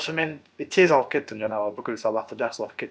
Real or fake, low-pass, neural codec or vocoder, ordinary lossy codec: fake; none; codec, 16 kHz, about 1 kbps, DyCAST, with the encoder's durations; none